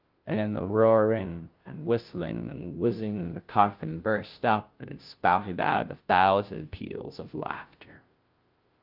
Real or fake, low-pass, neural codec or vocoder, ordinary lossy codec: fake; 5.4 kHz; codec, 16 kHz, 0.5 kbps, FunCodec, trained on Chinese and English, 25 frames a second; Opus, 32 kbps